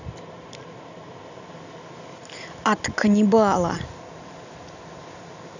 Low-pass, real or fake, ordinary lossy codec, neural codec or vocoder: 7.2 kHz; real; none; none